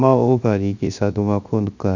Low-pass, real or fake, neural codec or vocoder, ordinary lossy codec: 7.2 kHz; fake; codec, 16 kHz, 0.7 kbps, FocalCodec; none